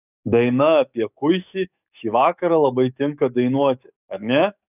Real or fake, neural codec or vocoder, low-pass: fake; codec, 44.1 kHz, 7.8 kbps, DAC; 3.6 kHz